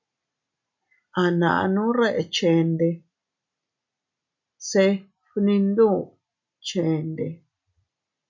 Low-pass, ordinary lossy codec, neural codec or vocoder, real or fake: 7.2 kHz; MP3, 48 kbps; none; real